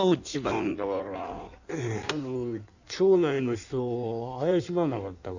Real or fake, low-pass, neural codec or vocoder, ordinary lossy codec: fake; 7.2 kHz; codec, 16 kHz in and 24 kHz out, 1.1 kbps, FireRedTTS-2 codec; none